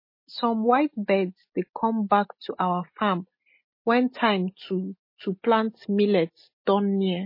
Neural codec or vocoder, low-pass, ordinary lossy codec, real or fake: none; 5.4 kHz; MP3, 24 kbps; real